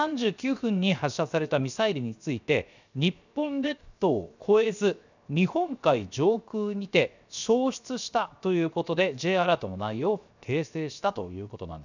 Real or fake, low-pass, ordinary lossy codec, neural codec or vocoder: fake; 7.2 kHz; none; codec, 16 kHz, 0.7 kbps, FocalCodec